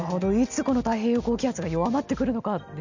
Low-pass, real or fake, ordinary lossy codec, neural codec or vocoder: 7.2 kHz; real; none; none